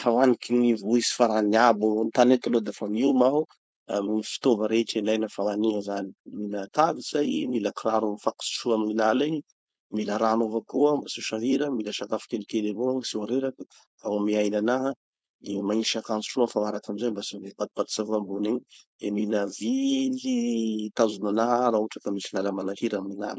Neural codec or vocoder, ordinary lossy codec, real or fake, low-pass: codec, 16 kHz, 4.8 kbps, FACodec; none; fake; none